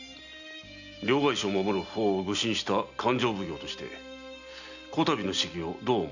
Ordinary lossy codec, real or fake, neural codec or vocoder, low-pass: none; real; none; 7.2 kHz